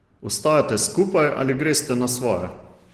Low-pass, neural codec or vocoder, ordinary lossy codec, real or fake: 14.4 kHz; none; Opus, 16 kbps; real